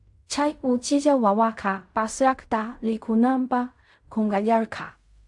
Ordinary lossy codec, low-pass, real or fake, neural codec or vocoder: AAC, 64 kbps; 10.8 kHz; fake; codec, 16 kHz in and 24 kHz out, 0.4 kbps, LongCat-Audio-Codec, fine tuned four codebook decoder